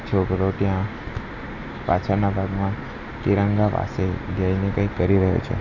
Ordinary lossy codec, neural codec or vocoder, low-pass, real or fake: AAC, 48 kbps; none; 7.2 kHz; real